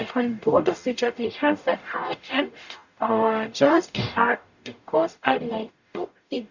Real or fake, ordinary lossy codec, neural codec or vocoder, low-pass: fake; none; codec, 44.1 kHz, 0.9 kbps, DAC; 7.2 kHz